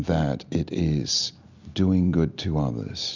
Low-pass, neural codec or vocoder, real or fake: 7.2 kHz; none; real